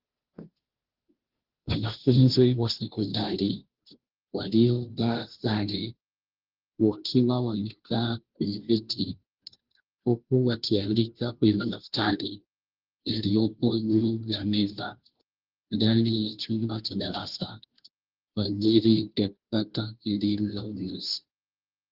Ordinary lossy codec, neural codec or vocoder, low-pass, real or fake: Opus, 16 kbps; codec, 16 kHz, 0.5 kbps, FunCodec, trained on Chinese and English, 25 frames a second; 5.4 kHz; fake